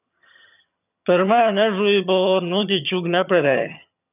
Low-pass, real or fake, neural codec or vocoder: 3.6 kHz; fake; vocoder, 22.05 kHz, 80 mel bands, HiFi-GAN